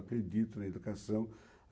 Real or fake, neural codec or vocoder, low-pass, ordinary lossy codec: real; none; none; none